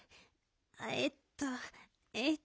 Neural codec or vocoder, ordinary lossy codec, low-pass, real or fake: none; none; none; real